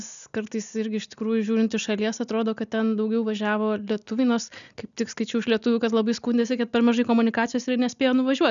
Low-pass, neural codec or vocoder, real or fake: 7.2 kHz; none; real